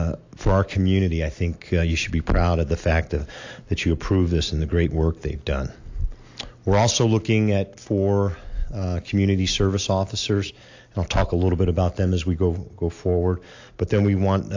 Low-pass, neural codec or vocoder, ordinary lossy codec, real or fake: 7.2 kHz; none; AAC, 48 kbps; real